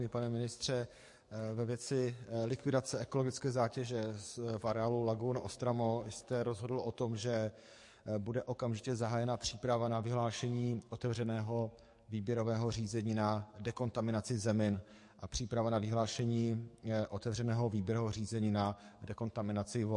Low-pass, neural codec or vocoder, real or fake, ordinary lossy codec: 10.8 kHz; codec, 44.1 kHz, 7.8 kbps, DAC; fake; MP3, 48 kbps